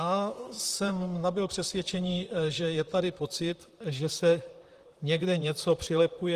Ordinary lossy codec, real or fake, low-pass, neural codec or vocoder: Opus, 24 kbps; fake; 14.4 kHz; vocoder, 44.1 kHz, 128 mel bands, Pupu-Vocoder